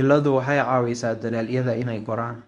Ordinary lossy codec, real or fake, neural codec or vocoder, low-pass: none; fake; codec, 24 kHz, 0.9 kbps, WavTokenizer, medium speech release version 1; 10.8 kHz